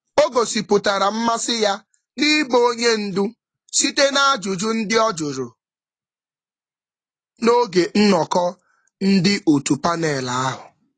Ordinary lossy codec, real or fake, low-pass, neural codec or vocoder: AAC, 32 kbps; real; 9.9 kHz; none